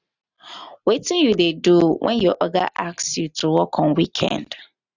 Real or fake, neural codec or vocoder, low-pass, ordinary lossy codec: real; none; 7.2 kHz; none